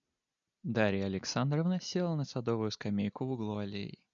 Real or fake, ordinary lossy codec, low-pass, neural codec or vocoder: real; MP3, 96 kbps; 7.2 kHz; none